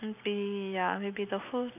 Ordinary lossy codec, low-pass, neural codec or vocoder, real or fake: none; 3.6 kHz; none; real